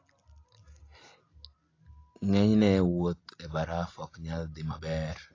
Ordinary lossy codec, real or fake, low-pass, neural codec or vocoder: AAC, 32 kbps; real; 7.2 kHz; none